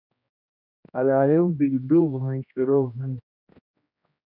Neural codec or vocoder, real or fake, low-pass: codec, 16 kHz, 1 kbps, X-Codec, HuBERT features, trained on general audio; fake; 5.4 kHz